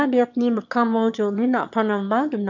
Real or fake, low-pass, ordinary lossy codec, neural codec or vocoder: fake; 7.2 kHz; none; autoencoder, 22.05 kHz, a latent of 192 numbers a frame, VITS, trained on one speaker